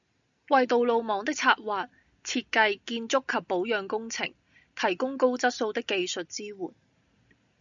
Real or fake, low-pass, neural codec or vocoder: real; 7.2 kHz; none